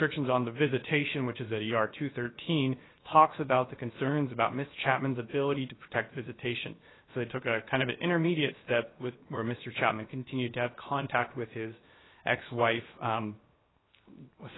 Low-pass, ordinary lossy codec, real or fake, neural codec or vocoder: 7.2 kHz; AAC, 16 kbps; fake; codec, 16 kHz, 0.7 kbps, FocalCodec